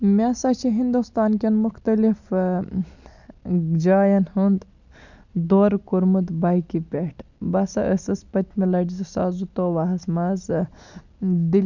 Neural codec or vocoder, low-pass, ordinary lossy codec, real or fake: none; 7.2 kHz; none; real